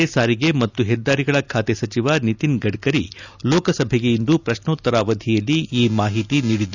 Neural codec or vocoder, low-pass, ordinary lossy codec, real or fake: none; 7.2 kHz; none; real